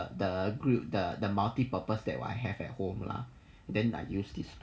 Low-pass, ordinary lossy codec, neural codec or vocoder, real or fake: none; none; none; real